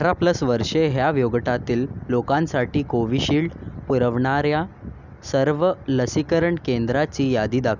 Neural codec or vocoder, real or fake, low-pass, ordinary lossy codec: none; real; 7.2 kHz; none